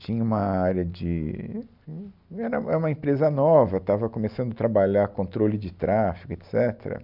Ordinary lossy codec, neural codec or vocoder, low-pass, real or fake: none; none; 5.4 kHz; real